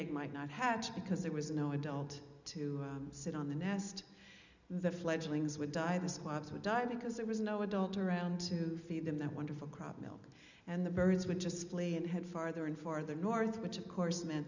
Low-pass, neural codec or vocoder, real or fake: 7.2 kHz; none; real